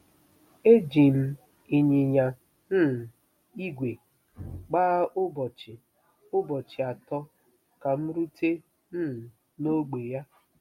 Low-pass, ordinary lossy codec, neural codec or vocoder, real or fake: 19.8 kHz; MP3, 64 kbps; none; real